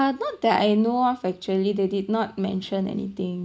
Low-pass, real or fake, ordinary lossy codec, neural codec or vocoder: none; real; none; none